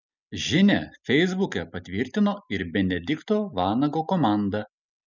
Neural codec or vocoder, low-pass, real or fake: none; 7.2 kHz; real